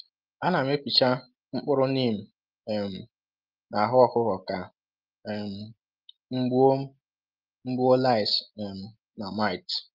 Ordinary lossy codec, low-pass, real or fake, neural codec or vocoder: Opus, 32 kbps; 5.4 kHz; real; none